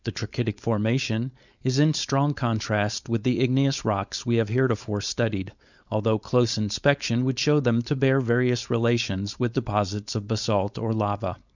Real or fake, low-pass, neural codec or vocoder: fake; 7.2 kHz; codec, 16 kHz, 4.8 kbps, FACodec